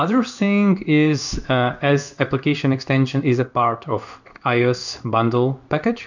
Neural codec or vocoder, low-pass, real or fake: codec, 16 kHz in and 24 kHz out, 1 kbps, XY-Tokenizer; 7.2 kHz; fake